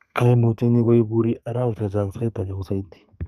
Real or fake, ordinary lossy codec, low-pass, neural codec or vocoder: fake; none; 14.4 kHz; codec, 32 kHz, 1.9 kbps, SNAC